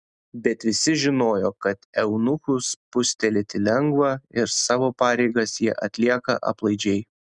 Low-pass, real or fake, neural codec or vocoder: 9.9 kHz; real; none